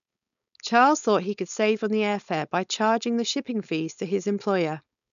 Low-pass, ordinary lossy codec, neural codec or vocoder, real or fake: 7.2 kHz; none; codec, 16 kHz, 4.8 kbps, FACodec; fake